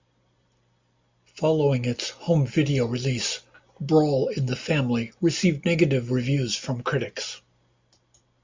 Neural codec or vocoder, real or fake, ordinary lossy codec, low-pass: none; real; MP3, 64 kbps; 7.2 kHz